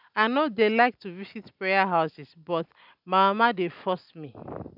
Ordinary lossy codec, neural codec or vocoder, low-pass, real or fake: none; none; 5.4 kHz; real